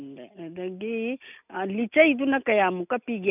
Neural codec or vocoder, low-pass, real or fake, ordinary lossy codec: none; 3.6 kHz; real; none